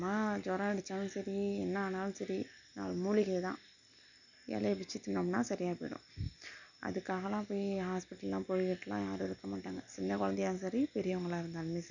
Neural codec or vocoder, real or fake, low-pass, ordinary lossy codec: none; real; 7.2 kHz; none